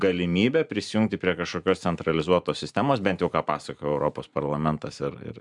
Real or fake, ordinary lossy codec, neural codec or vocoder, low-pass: fake; MP3, 96 kbps; vocoder, 44.1 kHz, 128 mel bands every 512 samples, BigVGAN v2; 10.8 kHz